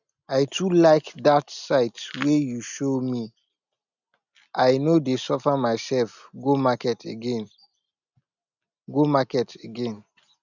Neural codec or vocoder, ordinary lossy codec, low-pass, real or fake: none; none; 7.2 kHz; real